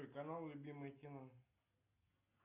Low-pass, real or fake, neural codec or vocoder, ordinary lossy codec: 3.6 kHz; real; none; AAC, 32 kbps